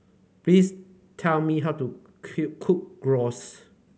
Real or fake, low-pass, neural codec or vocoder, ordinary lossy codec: real; none; none; none